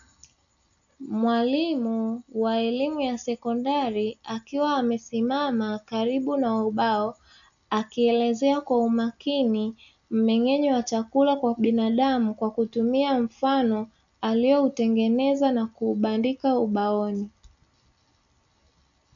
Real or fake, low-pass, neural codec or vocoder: real; 7.2 kHz; none